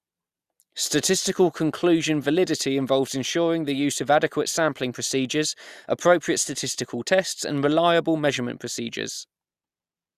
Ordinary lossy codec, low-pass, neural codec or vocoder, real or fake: Opus, 64 kbps; 14.4 kHz; none; real